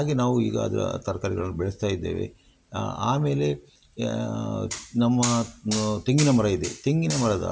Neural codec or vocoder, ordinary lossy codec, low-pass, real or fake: none; none; none; real